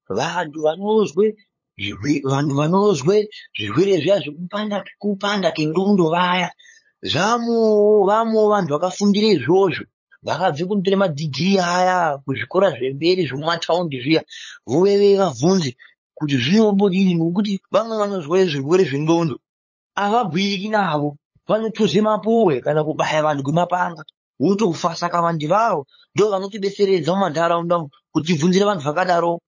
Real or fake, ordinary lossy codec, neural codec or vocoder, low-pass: fake; MP3, 32 kbps; codec, 16 kHz, 8 kbps, FunCodec, trained on LibriTTS, 25 frames a second; 7.2 kHz